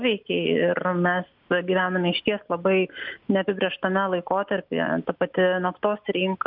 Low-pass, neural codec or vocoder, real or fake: 5.4 kHz; none; real